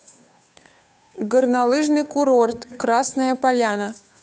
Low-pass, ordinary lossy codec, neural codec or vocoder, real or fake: none; none; codec, 16 kHz, 2 kbps, FunCodec, trained on Chinese and English, 25 frames a second; fake